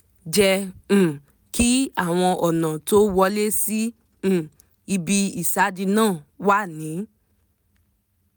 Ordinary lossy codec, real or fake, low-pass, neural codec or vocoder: none; real; none; none